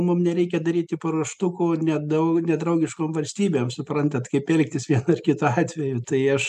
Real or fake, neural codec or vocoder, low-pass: real; none; 14.4 kHz